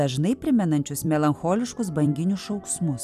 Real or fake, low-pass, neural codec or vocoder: fake; 14.4 kHz; vocoder, 44.1 kHz, 128 mel bands every 256 samples, BigVGAN v2